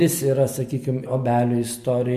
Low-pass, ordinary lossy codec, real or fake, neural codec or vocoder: 14.4 kHz; MP3, 64 kbps; real; none